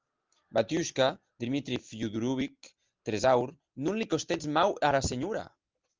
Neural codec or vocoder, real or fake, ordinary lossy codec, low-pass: none; real; Opus, 24 kbps; 7.2 kHz